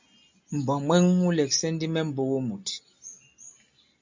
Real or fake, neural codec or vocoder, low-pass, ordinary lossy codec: real; none; 7.2 kHz; MP3, 64 kbps